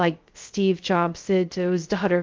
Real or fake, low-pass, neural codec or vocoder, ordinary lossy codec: fake; 7.2 kHz; codec, 16 kHz, 0.2 kbps, FocalCodec; Opus, 32 kbps